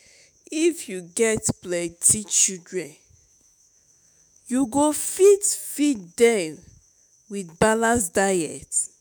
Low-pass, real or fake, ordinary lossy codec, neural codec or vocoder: none; fake; none; autoencoder, 48 kHz, 128 numbers a frame, DAC-VAE, trained on Japanese speech